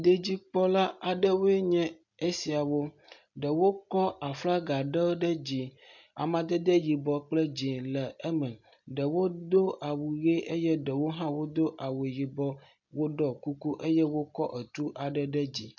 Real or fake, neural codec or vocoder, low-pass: real; none; 7.2 kHz